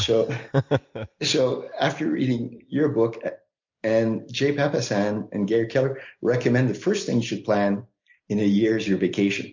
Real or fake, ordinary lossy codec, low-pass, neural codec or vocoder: real; MP3, 64 kbps; 7.2 kHz; none